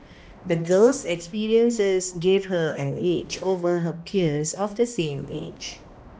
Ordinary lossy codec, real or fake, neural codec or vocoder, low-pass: none; fake; codec, 16 kHz, 1 kbps, X-Codec, HuBERT features, trained on balanced general audio; none